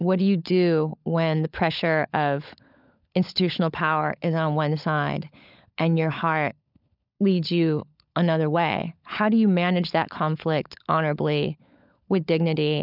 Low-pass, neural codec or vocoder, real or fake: 5.4 kHz; codec, 16 kHz, 16 kbps, FunCodec, trained on LibriTTS, 50 frames a second; fake